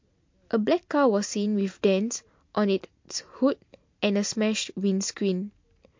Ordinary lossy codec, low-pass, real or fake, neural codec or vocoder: MP3, 48 kbps; 7.2 kHz; real; none